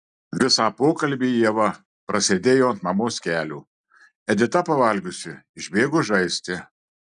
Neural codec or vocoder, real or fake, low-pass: none; real; 10.8 kHz